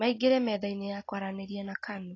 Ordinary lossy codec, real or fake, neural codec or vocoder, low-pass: AAC, 32 kbps; real; none; 7.2 kHz